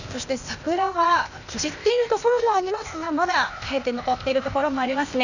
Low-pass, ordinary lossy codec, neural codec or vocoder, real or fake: 7.2 kHz; none; codec, 16 kHz, 0.8 kbps, ZipCodec; fake